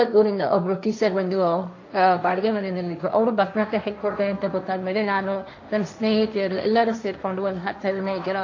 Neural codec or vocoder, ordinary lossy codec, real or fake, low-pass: codec, 16 kHz, 1.1 kbps, Voila-Tokenizer; AAC, 48 kbps; fake; 7.2 kHz